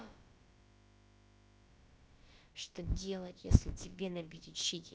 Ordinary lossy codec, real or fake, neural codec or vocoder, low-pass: none; fake; codec, 16 kHz, about 1 kbps, DyCAST, with the encoder's durations; none